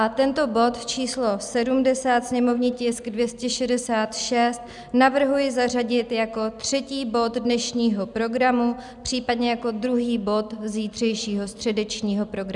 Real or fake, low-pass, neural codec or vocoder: real; 10.8 kHz; none